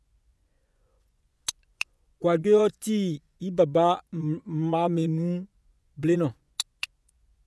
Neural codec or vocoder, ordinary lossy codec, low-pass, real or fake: vocoder, 24 kHz, 100 mel bands, Vocos; none; none; fake